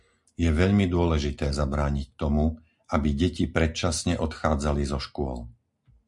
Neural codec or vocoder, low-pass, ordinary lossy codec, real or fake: none; 10.8 kHz; MP3, 64 kbps; real